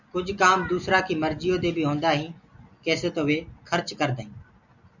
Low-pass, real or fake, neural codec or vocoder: 7.2 kHz; real; none